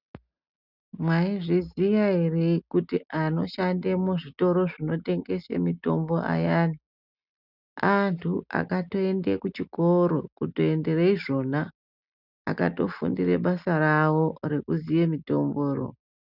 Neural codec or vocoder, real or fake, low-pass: none; real; 5.4 kHz